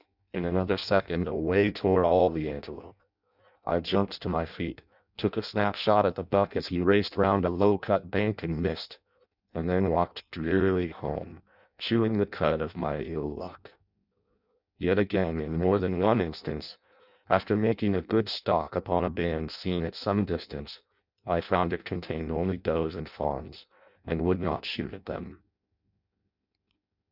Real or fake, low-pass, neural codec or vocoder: fake; 5.4 kHz; codec, 16 kHz in and 24 kHz out, 0.6 kbps, FireRedTTS-2 codec